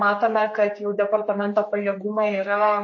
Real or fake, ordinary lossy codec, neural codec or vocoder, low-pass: fake; MP3, 32 kbps; codec, 16 kHz, 4 kbps, X-Codec, HuBERT features, trained on general audio; 7.2 kHz